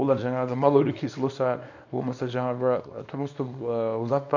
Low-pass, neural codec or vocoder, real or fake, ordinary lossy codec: 7.2 kHz; codec, 24 kHz, 0.9 kbps, WavTokenizer, small release; fake; none